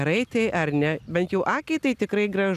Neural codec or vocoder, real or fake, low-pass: none; real; 14.4 kHz